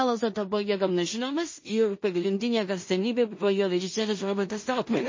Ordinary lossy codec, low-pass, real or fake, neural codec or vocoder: MP3, 32 kbps; 7.2 kHz; fake; codec, 16 kHz in and 24 kHz out, 0.4 kbps, LongCat-Audio-Codec, two codebook decoder